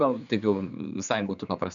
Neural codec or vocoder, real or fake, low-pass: codec, 16 kHz, 4 kbps, X-Codec, HuBERT features, trained on general audio; fake; 7.2 kHz